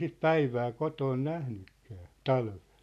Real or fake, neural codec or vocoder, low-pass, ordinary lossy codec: real; none; 14.4 kHz; none